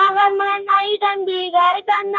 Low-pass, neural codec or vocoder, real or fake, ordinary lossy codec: 7.2 kHz; codec, 44.1 kHz, 2.6 kbps, DAC; fake; none